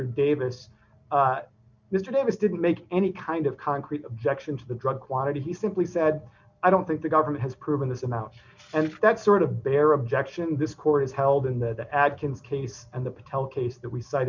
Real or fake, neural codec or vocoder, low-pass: real; none; 7.2 kHz